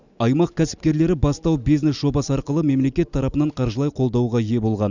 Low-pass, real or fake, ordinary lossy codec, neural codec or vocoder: 7.2 kHz; real; none; none